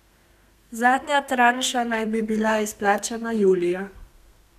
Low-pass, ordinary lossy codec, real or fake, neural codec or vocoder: 14.4 kHz; none; fake; codec, 32 kHz, 1.9 kbps, SNAC